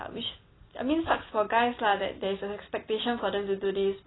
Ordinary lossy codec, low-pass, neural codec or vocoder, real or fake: AAC, 16 kbps; 7.2 kHz; none; real